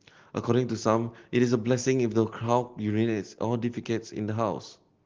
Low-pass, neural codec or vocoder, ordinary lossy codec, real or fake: 7.2 kHz; none; Opus, 16 kbps; real